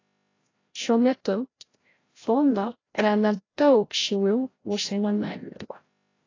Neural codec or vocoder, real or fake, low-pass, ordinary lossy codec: codec, 16 kHz, 0.5 kbps, FreqCodec, larger model; fake; 7.2 kHz; AAC, 32 kbps